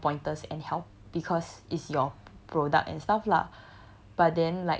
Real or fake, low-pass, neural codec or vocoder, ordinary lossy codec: real; none; none; none